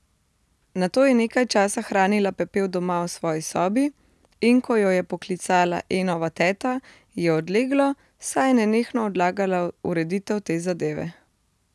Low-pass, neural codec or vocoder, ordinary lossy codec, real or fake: none; none; none; real